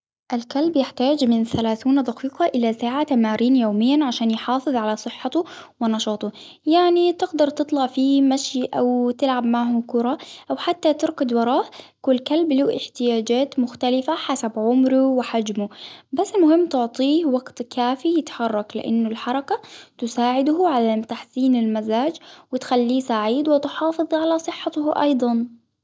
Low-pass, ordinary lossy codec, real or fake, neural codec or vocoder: none; none; real; none